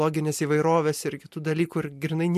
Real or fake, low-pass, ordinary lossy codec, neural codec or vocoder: real; 14.4 kHz; MP3, 64 kbps; none